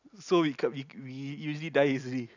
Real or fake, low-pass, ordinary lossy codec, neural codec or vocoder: real; 7.2 kHz; none; none